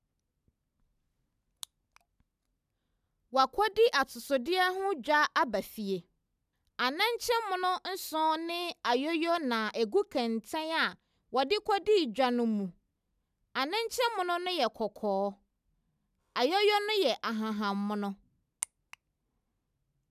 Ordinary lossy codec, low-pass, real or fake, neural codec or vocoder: none; 14.4 kHz; real; none